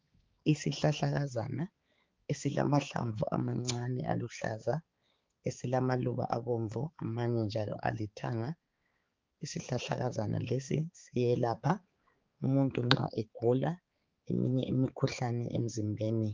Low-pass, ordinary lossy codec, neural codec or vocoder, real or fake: 7.2 kHz; Opus, 32 kbps; codec, 16 kHz, 4 kbps, X-Codec, HuBERT features, trained on balanced general audio; fake